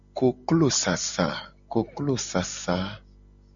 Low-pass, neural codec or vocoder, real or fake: 7.2 kHz; none; real